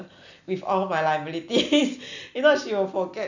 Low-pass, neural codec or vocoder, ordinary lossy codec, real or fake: 7.2 kHz; none; none; real